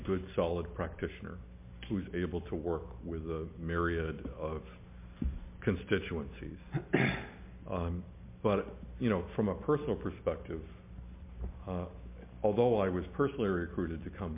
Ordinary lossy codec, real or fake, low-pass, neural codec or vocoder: MP3, 24 kbps; real; 3.6 kHz; none